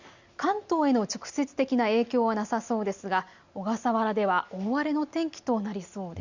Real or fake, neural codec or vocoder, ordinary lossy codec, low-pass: real; none; Opus, 64 kbps; 7.2 kHz